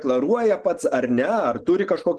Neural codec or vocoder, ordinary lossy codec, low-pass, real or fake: none; Opus, 16 kbps; 10.8 kHz; real